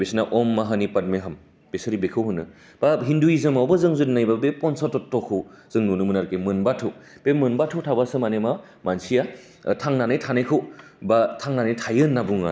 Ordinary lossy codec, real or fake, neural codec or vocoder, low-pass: none; real; none; none